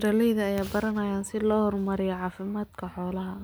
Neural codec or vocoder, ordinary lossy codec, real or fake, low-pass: none; none; real; none